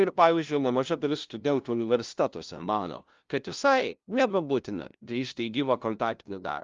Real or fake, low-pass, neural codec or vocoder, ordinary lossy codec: fake; 7.2 kHz; codec, 16 kHz, 0.5 kbps, FunCodec, trained on LibriTTS, 25 frames a second; Opus, 32 kbps